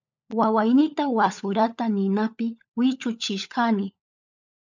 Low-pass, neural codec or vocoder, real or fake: 7.2 kHz; codec, 16 kHz, 16 kbps, FunCodec, trained on LibriTTS, 50 frames a second; fake